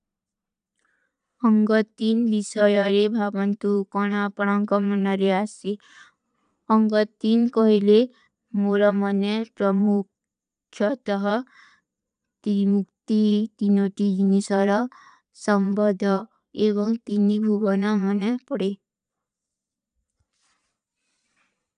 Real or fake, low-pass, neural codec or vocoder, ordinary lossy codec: fake; 9.9 kHz; vocoder, 22.05 kHz, 80 mel bands, Vocos; none